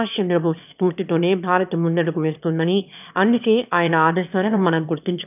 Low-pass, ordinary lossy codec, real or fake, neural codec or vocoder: 3.6 kHz; none; fake; autoencoder, 22.05 kHz, a latent of 192 numbers a frame, VITS, trained on one speaker